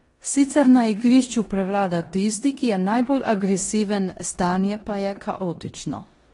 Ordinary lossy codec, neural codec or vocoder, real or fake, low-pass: AAC, 32 kbps; codec, 16 kHz in and 24 kHz out, 0.9 kbps, LongCat-Audio-Codec, four codebook decoder; fake; 10.8 kHz